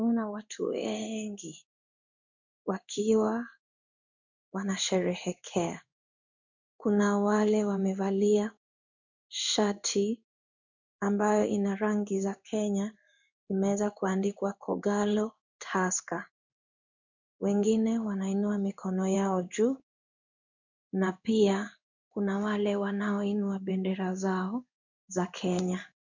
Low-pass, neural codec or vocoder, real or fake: 7.2 kHz; codec, 16 kHz in and 24 kHz out, 1 kbps, XY-Tokenizer; fake